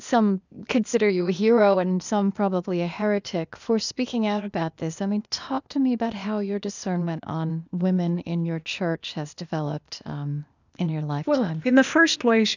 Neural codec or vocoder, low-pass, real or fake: codec, 16 kHz, 0.8 kbps, ZipCodec; 7.2 kHz; fake